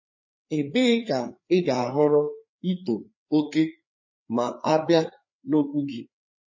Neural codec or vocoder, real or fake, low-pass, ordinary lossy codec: codec, 16 kHz, 4 kbps, FreqCodec, larger model; fake; 7.2 kHz; MP3, 32 kbps